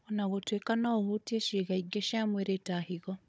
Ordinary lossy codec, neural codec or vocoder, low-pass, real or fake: none; codec, 16 kHz, 16 kbps, FunCodec, trained on Chinese and English, 50 frames a second; none; fake